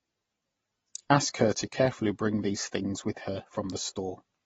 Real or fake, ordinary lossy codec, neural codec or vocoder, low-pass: real; AAC, 24 kbps; none; 19.8 kHz